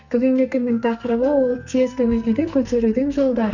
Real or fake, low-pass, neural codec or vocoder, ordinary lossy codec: fake; 7.2 kHz; codec, 44.1 kHz, 2.6 kbps, SNAC; none